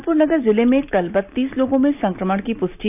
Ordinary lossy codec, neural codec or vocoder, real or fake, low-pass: none; none; real; 3.6 kHz